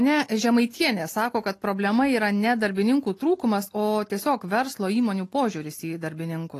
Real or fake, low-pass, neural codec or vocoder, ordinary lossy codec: real; 14.4 kHz; none; AAC, 48 kbps